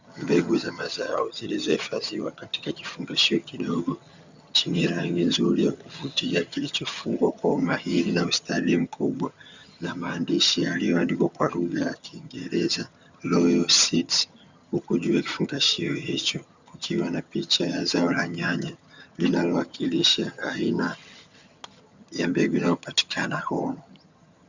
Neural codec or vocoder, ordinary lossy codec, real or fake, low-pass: vocoder, 22.05 kHz, 80 mel bands, HiFi-GAN; Opus, 64 kbps; fake; 7.2 kHz